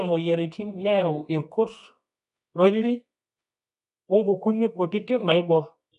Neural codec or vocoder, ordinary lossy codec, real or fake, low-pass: codec, 24 kHz, 0.9 kbps, WavTokenizer, medium music audio release; none; fake; 10.8 kHz